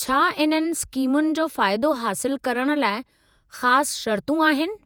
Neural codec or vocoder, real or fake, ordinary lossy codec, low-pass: vocoder, 48 kHz, 128 mel bands, Vocos; fake; none; none